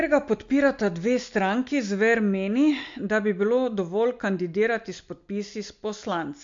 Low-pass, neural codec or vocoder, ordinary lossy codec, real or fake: 7.2 kHz; none; MP3, 48 kbps; real